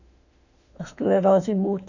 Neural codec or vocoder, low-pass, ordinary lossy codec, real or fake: autoencoder, 48 kHz, 32 numbers a frame, DAC-VAE, trained on Japanese speech; 7.2 kHz; none; fake